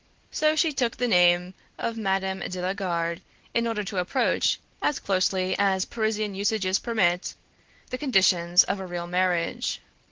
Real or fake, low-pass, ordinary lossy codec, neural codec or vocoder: real; 7.2 kHz; Opus, 16 kbps; none